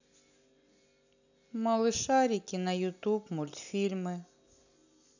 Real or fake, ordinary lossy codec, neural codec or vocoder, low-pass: real; none; none; 7.2 kHz